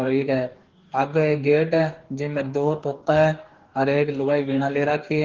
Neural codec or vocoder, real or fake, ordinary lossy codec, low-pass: codec, 44.1 kHz, 2.6 kbps, DAC; fake; Opus, 16 kbps; 7.2 kHz